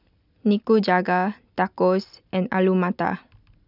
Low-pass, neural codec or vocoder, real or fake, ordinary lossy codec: 5.4 kHz; none; real; none